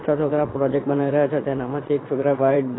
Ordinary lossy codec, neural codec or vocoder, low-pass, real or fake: AAC, 16 kbps; vocoder, 22.05 kHz, 80 mel bands, Vocos; 7.2 kHz; fake